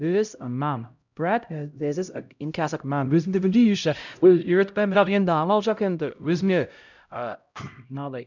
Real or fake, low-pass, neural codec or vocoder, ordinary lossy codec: fake; 7.2 kHz; codec, 16 kHz, 0.5 kbps, X-Codec, HuBERT features, trained on LibriSpeech; none